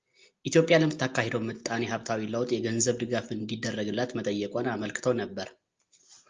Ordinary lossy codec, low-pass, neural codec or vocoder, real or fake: Opus, 32 kbps; 7.2 kHz; none; real